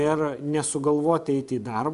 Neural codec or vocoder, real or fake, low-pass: vocoder, 24 kHz, 100 mel bands, Vocos; fake; 10.8 kHz